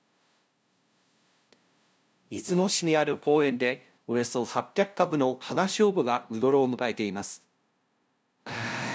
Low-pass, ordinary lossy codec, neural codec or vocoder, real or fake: none; none; codec, 16 kHz, 0.5 kbps, FunCodec, trained on LibriTTS, 25 frames a second; fake